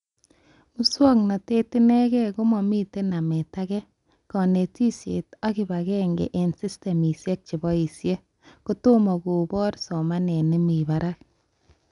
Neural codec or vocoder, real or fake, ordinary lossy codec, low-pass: none; real; none; 10.8 kHz